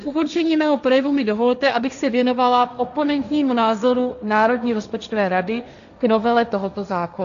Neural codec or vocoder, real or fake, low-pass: codec, 16 kHz, 1.1 kbps, Voila-Tokenizer; fake; 7.2 kHz